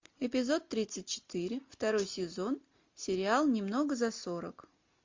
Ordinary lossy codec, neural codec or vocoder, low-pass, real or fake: MP3, 48 kbps; none; 7.2 kHz; real